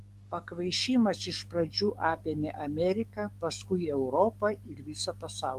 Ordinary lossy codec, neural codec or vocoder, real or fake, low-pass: Opus, 32 kbps; codec, 44.1 kHz, 7.8 kbps, DAC; fake; 14.4 kHz